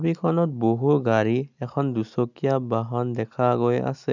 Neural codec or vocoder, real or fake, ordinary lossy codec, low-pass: none; real; none; 7.2 kHz